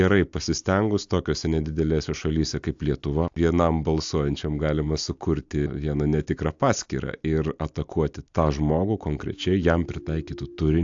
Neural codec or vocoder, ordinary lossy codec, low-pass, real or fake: none; AAC, 64 kbps; 7.2 kHz; real